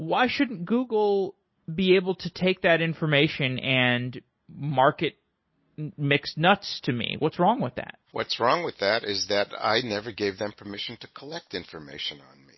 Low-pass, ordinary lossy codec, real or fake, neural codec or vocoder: 7.2 kHz; MP3, 24 kbps; real; none